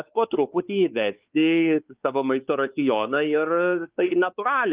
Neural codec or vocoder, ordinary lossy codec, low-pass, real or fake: codec, 16 kHz, 4 kbps, X-Codec, WavLM features, trained on Multilingual LibriSpeech; Opus, 24 kbps; 3.6 kHz; fake